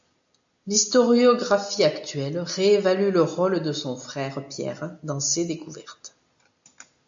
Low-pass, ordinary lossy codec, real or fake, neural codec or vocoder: 7.2 kHz; AAC, 64 kbps; real; none